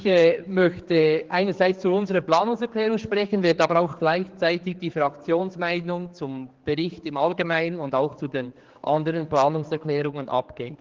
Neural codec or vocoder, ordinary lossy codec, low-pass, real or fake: codec, 16 kHz, 4 kbps, X-Codec, HuBERT features, trained on general audio; Opus, 16 kbps; 7.2 kHz; fake